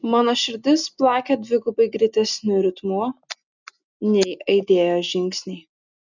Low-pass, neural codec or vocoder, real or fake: 7.2 kHz; none; real